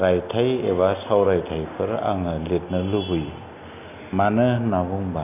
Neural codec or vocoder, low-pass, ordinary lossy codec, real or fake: none; 3.6 kHz; none; real